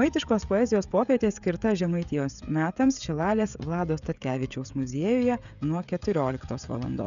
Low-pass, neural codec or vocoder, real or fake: 7.2 kHz; codec, 16 kHz, 16 kbps, FreqCodec, smaller model; fake